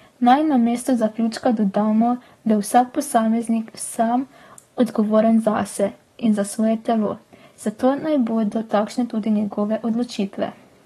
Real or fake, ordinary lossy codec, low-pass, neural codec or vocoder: fake; AAC, 32 kbps; 19.8 kHz; codec, 44.1 kHz, 7.8 kbps, DAC